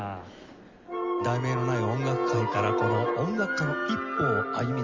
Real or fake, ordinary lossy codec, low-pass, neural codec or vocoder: real; Opus, 32 kbps; 7.2 kHz; none